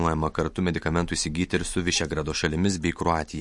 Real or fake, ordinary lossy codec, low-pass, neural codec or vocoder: real; MP3, 48 kbps; 10.8 kHz; none